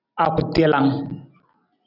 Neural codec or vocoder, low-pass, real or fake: none; 5.4 kHz; real